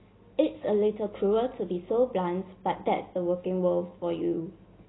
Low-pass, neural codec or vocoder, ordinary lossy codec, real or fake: 7.2 kHz; none; AAC, 16 kbps; real